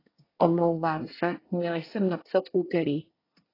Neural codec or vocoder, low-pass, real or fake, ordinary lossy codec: codec, 24 kHz, 1 kbps, SNAC; 5.4 kHz; fake; AAC, 24 kbps